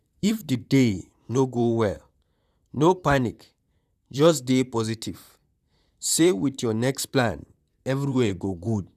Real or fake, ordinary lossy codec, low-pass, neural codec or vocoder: fake; none; 14.4 kHz; vocoder, 44.1 kHz, 128 mel bands, Pupu-Vocoder